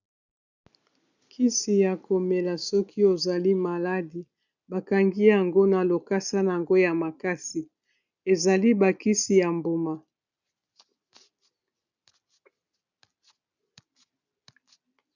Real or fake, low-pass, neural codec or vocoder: real; 7.2 kHz; none